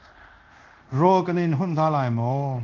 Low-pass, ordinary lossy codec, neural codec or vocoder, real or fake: 7.2 kHz; Opus, 32 kbps; codec, 24 kHz, 0.5 kbps, DualCodec; fake